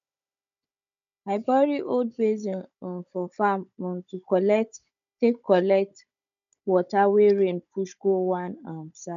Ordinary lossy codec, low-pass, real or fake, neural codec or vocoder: none; 7.2 kHz; fake; codec, 16 kHz, 16 kbps, FunCodec, trained on Chinese and English, 50 frames a second